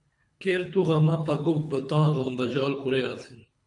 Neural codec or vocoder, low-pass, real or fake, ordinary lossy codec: codec, 24 kHz, 3 kbps, HILCodec; 10.8 kHz; fake; MP3, 64 kbps